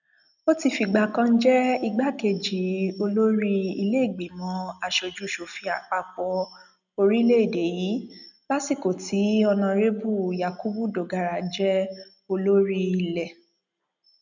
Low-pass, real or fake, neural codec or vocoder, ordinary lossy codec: 7.2 kHz; real; none; none